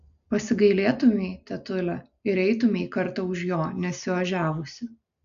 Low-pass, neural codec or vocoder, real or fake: 7.2 kHz; none; real